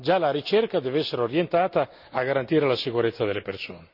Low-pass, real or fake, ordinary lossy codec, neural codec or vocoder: 5.4 kHz; real; none; none